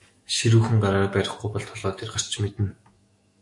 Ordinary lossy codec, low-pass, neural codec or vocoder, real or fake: MP3, 48 kbps; 10.8 kHz; autoencoder, 48 kHz, 128 numbers a frame, DAC-VAE, trained on Japanese speech; fake